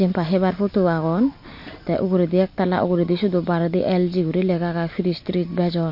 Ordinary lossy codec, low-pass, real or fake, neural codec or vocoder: MP3, 32 kbps; 5.4 kHz; real; none